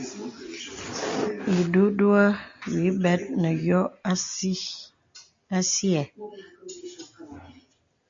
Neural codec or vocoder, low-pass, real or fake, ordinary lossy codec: none; 7.2 kHz; real; MP3, 48 kbps